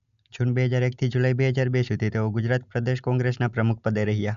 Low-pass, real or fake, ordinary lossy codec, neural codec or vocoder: 7.2 kHz; real; none; none